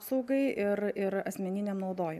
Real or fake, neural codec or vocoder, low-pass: real; none; 14.4 kHz